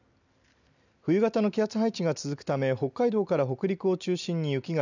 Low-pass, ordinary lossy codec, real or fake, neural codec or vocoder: 7.2 kHz; none; real; none